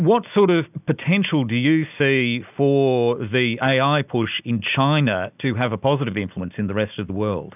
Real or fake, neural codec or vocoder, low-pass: real; none; 3.6 kHz